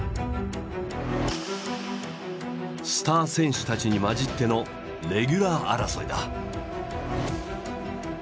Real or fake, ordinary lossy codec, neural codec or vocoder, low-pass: real; none; none; none